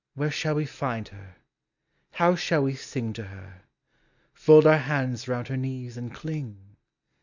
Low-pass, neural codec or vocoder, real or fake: 7.2 kHz; none; real